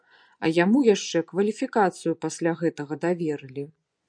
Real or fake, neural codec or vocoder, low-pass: fake; vocoder, 24 kHz, 100 mel bands, Vocos; 9.9 kHz